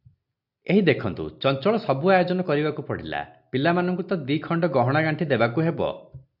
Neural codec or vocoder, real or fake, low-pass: none; real; 5.4 kHz